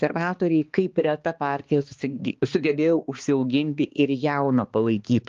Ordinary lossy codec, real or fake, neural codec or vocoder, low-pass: Opus, 32 kbps; fake; codec, 16 kHz, 2 kbps, X-Codec, HuBERT features, trained on balanced general audio; 7.2 kHz